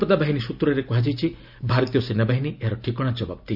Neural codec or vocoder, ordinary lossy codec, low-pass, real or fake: none; none; 5.4 kHz; real